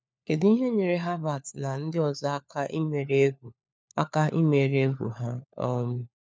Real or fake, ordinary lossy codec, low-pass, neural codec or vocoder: fake; none; none; codec, 16 kHz, 4 kbps, FunCodec, trained on LibriTTS, 50 frames a second